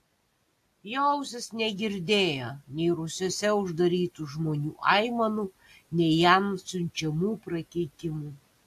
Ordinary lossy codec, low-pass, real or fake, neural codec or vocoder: AAC, 64 kbps; 14.4 kHz; real; none